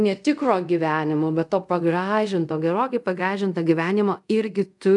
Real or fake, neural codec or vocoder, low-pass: fake; codec, 24 kHz, 0.5 kbps, DualCodec; 10.8 kHz